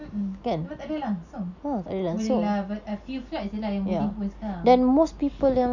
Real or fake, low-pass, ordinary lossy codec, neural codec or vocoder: real; 7.2 kHz; none; none